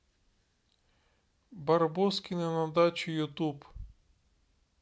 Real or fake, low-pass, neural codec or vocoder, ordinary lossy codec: real; none; none; none